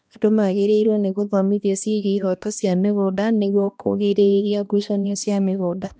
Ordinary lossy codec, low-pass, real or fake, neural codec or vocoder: none; none; fake; codec, 16 kHz, 1 kbps, X-Codec, HuBERT features, trained on balanced general audio